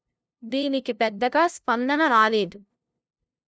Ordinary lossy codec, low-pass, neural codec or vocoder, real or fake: none; none; codec, 16 kHz, 0.5 kbps, FunCodec, trained on LibriTTS, 25 frames a second; fake